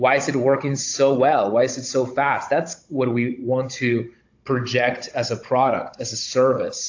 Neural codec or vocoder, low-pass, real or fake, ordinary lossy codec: codec, 16 kHz, 16 kbps, FunCodec, trained on Chinese and English, 50 frames a second; 7.2 kHz; fake; AAC, 48 kbps